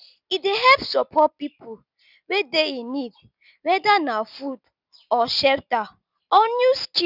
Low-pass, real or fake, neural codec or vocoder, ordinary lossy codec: 5.4 kHz; real; none; none